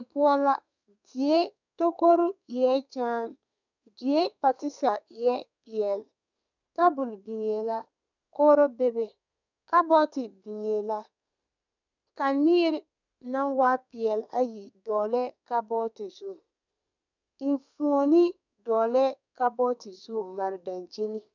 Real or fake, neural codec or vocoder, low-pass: fake; codec, 32 kHz, 1.9 kbps, SNAC; 7.2 kHz